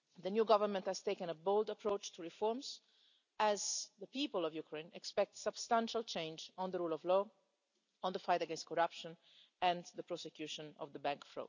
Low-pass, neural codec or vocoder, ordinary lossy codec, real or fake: 7.2 kHz; none; none; real